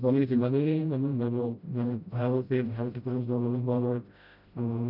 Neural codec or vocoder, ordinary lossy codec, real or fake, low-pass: codec, 16 kHz, 0.5 kbps, FreqCodec, smaller model; none; fake; 5.4 kHz